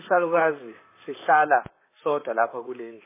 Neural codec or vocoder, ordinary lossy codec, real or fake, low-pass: vocoder, 44.1 kHz, 128 mel bands, Pupu-Vocoder; MP3, 16 kbps; fake; 3.6 kHz